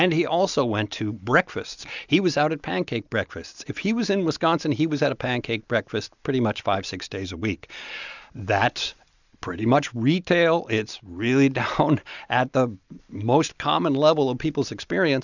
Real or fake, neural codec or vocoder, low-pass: real; none; 7.2 kHz